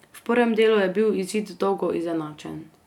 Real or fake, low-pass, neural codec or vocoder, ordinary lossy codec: real; 19.8 kHz; none; none